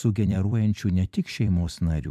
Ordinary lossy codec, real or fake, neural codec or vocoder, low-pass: AAC, 96 kbps; fake; vocoder, 44.1 kHz, 128 mel bands every 256 samples, BigVGAN v2; 14.4 kHz